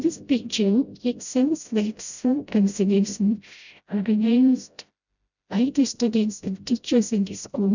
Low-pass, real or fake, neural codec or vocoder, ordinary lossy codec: 7.2 kHz; fake; codec, 16 kHz, 0.5 kbps, FreqCodec, smaller model; none